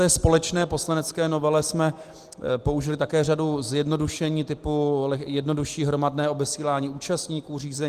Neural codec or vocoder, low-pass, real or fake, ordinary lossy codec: none; 14.4 kHz; real; Opus, 24 kbps